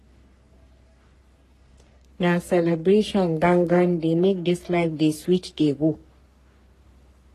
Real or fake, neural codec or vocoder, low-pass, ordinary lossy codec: fake; codec, 44.1 kHz, 3.4 kbps, Pupu-Codec; 14.4 kHz; AAC, 48 kbps